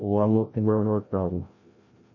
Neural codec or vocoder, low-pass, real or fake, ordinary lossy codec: codec, 16 kHz, 0.5 kbps, FreqCodec, larger model; 7.2 kHz; fake; MP3, 32 kbps